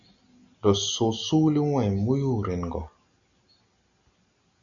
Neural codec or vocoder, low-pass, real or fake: none; 7.2 kHz; real